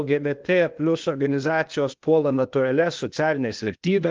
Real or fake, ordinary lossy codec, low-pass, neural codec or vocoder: fake; Opus, 32 kbps; 7.2 kHz; codec, 16 kHz, 0.8 kbps, ZipCodec